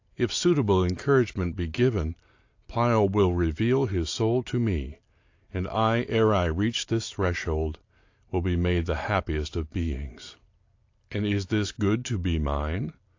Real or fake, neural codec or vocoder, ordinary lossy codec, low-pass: real; none; AAC, 48 kbps; 7.2 kHz